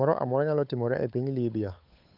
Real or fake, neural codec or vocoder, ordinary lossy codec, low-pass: fake; codec, 16 kHz, 8 kbps, FunCodec, trained on Chinese and English, 25 frames a second; none; 5.4 kHz